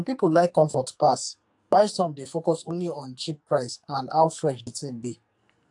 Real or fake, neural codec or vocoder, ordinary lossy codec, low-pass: fake; codec, 44.1 kHz, 2.6 kbps, SNAC; AAC, 64 kbps; 10.8 kHz